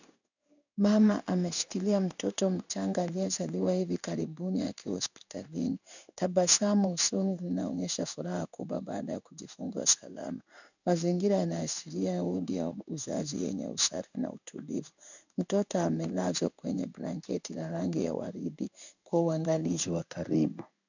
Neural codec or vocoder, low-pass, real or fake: codec, 16 kHz in and 24 kHz out, 1 kbps, XY-Tokenizer; 7.2 kHz; fake